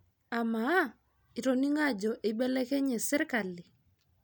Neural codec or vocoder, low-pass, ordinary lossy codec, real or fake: none; none; none; real